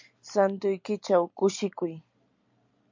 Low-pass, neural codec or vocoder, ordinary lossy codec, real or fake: 7.2 kHz; none; MP3, 64 kbps; real